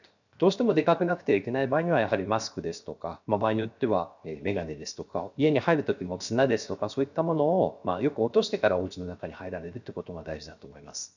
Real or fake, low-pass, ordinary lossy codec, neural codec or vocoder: fake; 7.2 kHz; none; codec, 16 kHz, 0.7 kbps, FocalCodec